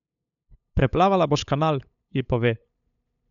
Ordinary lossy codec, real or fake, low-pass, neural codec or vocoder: none; fake; 7.2 kHz; codec, 16 kHz, 8 kbps, FunCodec, trained on LibriTTS, 25 frames a second